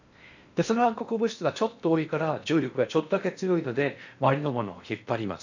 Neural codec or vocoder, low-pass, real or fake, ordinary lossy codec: codec, 16 kHz in and 24 kHz out, 0.8 kbps, FocalCodec, streaming, 65536 codes; 7.2 kHz; fake; none